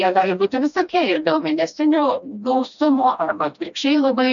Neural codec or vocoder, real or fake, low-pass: codec, 16 kHz, 1 kbps, FreqCodec, smaller model; fake; 7.2 kHz